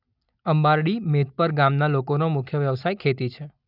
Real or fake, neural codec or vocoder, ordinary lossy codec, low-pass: real; none; none; 5.4 kHz